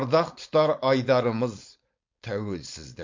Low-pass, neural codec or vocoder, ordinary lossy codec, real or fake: 7.2 kHz; codec, 16 kHz, 4.8 kbps, FACodec; MP3, 48 kbps; fake